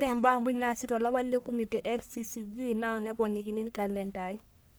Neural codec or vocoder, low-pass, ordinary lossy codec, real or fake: codec, 44.1 kHz, 1.7 kbps, Pupu-Codec; none; none; fake